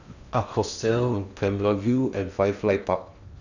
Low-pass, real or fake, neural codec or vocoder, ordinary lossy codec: 7.2 kHz; fake; codec, 16 kHz in and 24 kHz out, 0.8 kbps, FocalCodec, streaming, 65536 codes; none